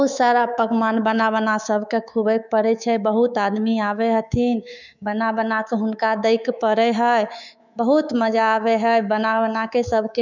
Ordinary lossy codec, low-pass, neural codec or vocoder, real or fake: none; 7.2 kHz; codec, 24 kHz, 3.1 kbps, DualCodec; fake